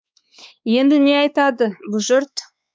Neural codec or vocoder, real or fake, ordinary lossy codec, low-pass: codec, 16 kHz, 4 kbps, X-Codec, WavLM features, trained on Multilingual LibriSpeech; fake; none; none